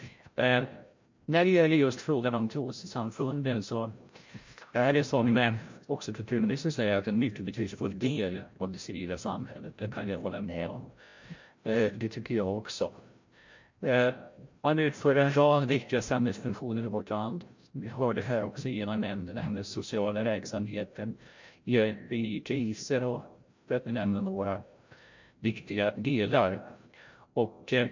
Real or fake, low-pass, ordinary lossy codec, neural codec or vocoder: fake; 7.2 kHz; MP3, 48 kbps; codec, 16 kHz, 0.5 kbps, FreqCodec, larger model